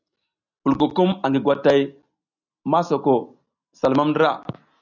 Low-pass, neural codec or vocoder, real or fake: 7.2 kHz; none; real